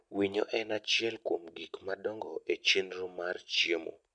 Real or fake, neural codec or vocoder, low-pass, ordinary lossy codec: real; none; 9.9 kHz; none